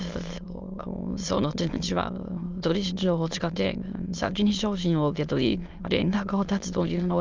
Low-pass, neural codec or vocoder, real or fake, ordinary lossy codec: 7.2 kHz; autoencoder, 22.05 kHz, a latent of 192 numbers a frame, VITS, trained on many speakers; fake; Opus, 32 kbps